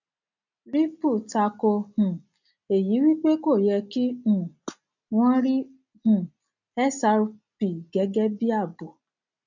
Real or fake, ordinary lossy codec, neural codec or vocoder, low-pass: real; none; none; 7.2 kHz